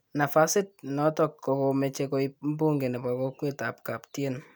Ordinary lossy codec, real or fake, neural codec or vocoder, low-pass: none; real; none; none